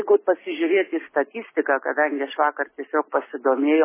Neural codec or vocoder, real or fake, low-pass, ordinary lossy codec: none; real; 3.6 kHz; MP3, 16 kbps